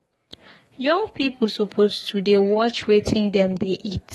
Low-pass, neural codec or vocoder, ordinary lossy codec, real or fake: 14.4 kHz; codec, 32 kHz, 1.9 kbps, SNAC; AAC, 32 kbps; fake